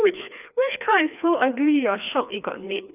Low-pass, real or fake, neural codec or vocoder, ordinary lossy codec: 3.6 kHz; fake; codec, 16 kHz, 2 kbps, FreqCodec, larger model; none